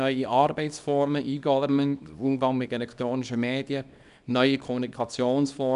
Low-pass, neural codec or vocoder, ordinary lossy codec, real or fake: 10.8 kHz; codec, 24 kHz, 0.9 kbps, WavTokenizer, small release; none; fake